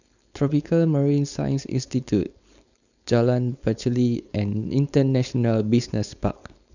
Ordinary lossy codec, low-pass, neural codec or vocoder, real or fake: none; 7.2 kHz; codec, 16 kHz, 4.8 kbps, FACodec; fake